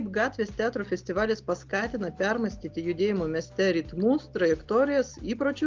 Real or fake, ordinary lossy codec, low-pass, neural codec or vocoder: real; Opus, 24 kbps; 7.2 kHz; none